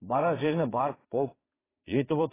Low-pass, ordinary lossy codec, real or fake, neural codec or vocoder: 3.6 kHz; AAC, 16 kbps; fake; vocoder, 22.05 kHz, 80 mel bands, Vocos